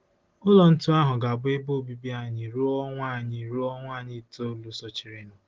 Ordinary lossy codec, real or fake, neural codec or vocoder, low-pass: Opus, 16 kbps; real; none; 7.2 kHz